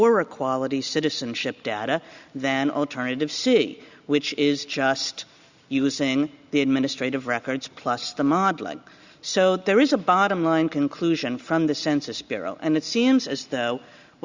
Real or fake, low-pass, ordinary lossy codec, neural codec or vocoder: real; 7.2 kHz; Opus, 64 kbps; none